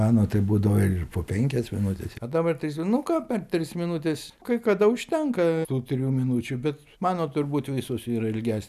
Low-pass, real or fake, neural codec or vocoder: 14.4 kHz; real; none